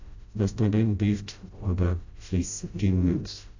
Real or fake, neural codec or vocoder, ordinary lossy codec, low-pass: fake; codec, 16 kHz, 0.5 kbps, FreqCodec, smaller model; AAC, 48 kbps; 7.2 kHz